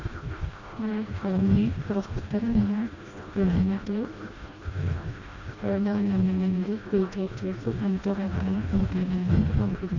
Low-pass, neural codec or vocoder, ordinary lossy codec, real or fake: 7.2 kHz; codec, 16 kHz, 1 kbps, FreqCodec, smaller model; Opus, 64 kbps; fake